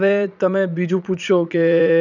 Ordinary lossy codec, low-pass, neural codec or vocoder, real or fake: none; 7.2 kHz; vocoder, 44.1 kHz, 80 mel bands, Vocos; fake